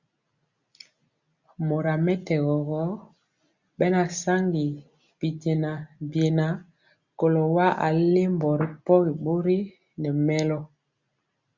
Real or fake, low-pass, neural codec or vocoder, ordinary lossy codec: real; 7.2 kHz; none; Opus, 64 kbps